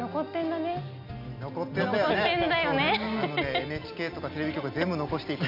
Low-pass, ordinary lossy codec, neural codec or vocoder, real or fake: 5.4 kHz; none; none; real